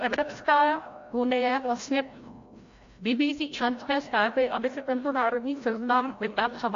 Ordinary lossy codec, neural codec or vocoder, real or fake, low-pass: AAC, 48 kbps; codec, 16 kHz, 0.5 kbps, FreqCodec, larger model; fake; 7.2 kHz